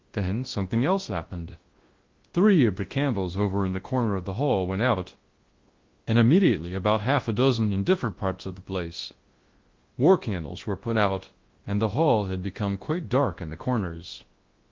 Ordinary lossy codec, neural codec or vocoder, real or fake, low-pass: Opus, 16 kbps; codec, 24 kHz, 0.9 kbps, WavTokenizer, large speech release; fake; 7.2 kHz